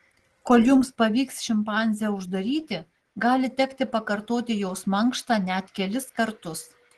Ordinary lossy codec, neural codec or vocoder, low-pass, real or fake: Opus, 16 kbps; none; 10.8 kHz; real